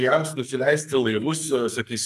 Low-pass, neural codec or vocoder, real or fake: 14.4 kHz; codec, 44.1 kHz, 2.6 kbps, SNAC; fake